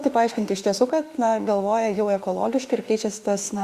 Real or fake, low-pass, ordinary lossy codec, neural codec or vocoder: fake; 14.4 kHz; Opus, 64 kbps; autoencoder, 48 kHz, 32 numbers a frame, DAC-VAE, trained on Japanese speech